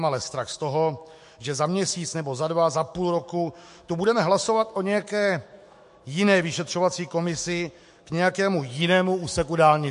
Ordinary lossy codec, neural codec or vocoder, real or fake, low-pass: MP3, 48 kbps; autoencoder, 48 kHz, 128 numbers a frame, DAC-VAE, trained on Japanese speech; fake; 14.4 kHz